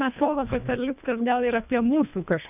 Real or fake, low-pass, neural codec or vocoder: fake; 3.6 kHz; codec, 24 kHz, 1.5 kbps, HILCodec